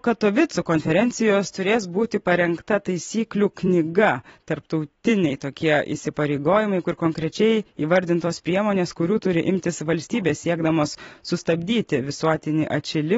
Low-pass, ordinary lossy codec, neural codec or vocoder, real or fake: 19.8 kHz; AAC, 24 kbps; vocoder, 44.1 kHz, 128 mel bands every 256 samples, BigVGAN v2; fake